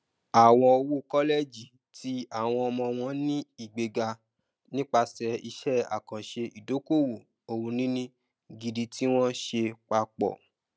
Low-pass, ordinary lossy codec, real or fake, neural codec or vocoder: none; none; real; none